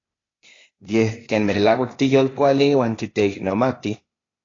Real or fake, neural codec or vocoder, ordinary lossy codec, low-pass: fake; codec, 16 kHz, 0.8 kbps, ZipCodec; AAC, 32 kbps; 7.2 kHz